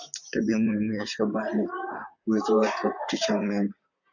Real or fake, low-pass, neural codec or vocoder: fake; 7.2 kHz; vocoder, 44.1 kHz, 128 mel bands, Pupu-Vocoder